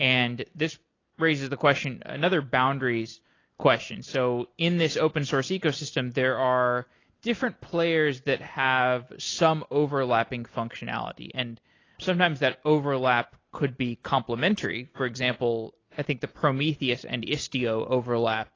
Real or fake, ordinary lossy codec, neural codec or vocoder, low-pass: real; AAC, 32 kbps; none; 7.2 kHz